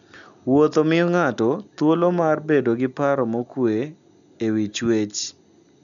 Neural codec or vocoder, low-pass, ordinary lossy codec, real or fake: none; 7.2 kHz; none; real